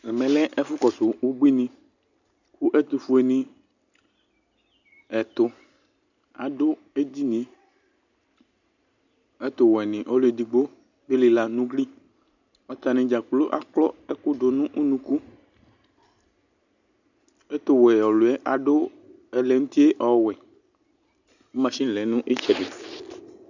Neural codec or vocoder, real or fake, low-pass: none; real; 7.2 kHz